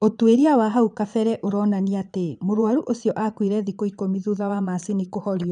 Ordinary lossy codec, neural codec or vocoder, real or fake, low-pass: none; vocoder, 22.05 kHz, 80 mel bands, Vocos; fake; 9.9 kHz